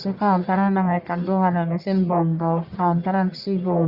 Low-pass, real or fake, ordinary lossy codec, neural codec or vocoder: 5.4 kHz; fake; Opus, 64 kbps; codec, 44.1 kHz, 1.7 kbps, Pupu-Codec